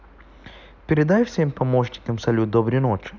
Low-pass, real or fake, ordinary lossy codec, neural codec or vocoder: 7.2 kHz; real; none; none